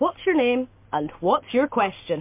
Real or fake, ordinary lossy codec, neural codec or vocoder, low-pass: real; MP3, 24 kbps; none; 3.6 kHz